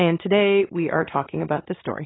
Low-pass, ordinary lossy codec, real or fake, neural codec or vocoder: 7.2 kHz; AAC, 16 kbps; real; none